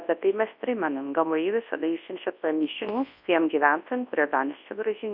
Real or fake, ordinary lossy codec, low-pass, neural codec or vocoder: fake; MP3, 48 kbps; 5.4 kHz; codec, 24 kHz, 0.9 kbps, WavTokenizer, large speech release